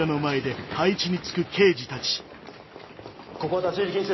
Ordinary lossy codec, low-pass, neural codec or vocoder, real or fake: MP3, 24 kbps; 7.2 kHz; none; real